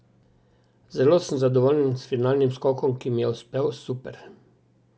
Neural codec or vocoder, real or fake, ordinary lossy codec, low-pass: none; real; none; none